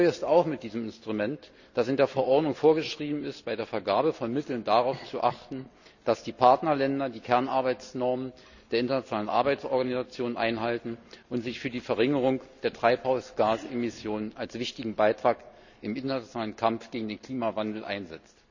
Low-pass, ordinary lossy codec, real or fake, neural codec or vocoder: 7.2 kHz; none; real; none